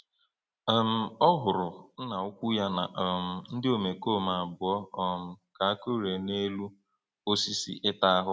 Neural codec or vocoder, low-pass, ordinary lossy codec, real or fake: none; none; none; real